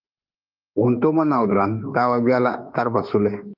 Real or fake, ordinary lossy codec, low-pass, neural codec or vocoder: fake; Opus, 32 kbps; 5.4 kHz; autoencoder, 48 kHz, 32 numbers a frame, DAC-VAE, trained on Japanese speech